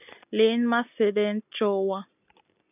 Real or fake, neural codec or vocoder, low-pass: real; none; 3.6 kHz